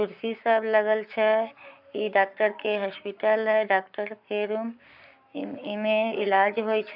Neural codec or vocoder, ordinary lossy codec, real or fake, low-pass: codec, 44.1 kHz, 7.8 kbps, Pupu-Codec; none; fake; 5.4 kHz